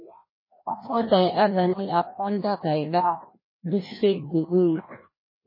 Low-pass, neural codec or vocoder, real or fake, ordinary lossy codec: 5.4 kHz; codec, 16 kHz, 1 kbps, FreqCodec, larger model; fake; MP3, 24 kbps